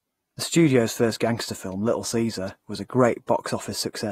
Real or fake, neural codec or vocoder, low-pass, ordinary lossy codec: fake; vocoder, 48 kHz, 128 mel bands, Vocos; 19.8 kHz; AAC, 48 kbps